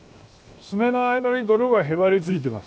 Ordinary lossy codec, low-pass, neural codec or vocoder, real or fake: none; none; codec, 16 kHz, 0.7 kbps, FocalCodec; fake